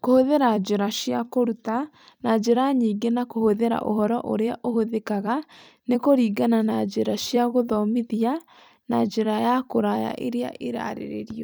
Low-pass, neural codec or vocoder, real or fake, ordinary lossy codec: none; vocoder, 44.1 kHz, 128 mel bands every 256 samples, BigVGAN v2; fake; none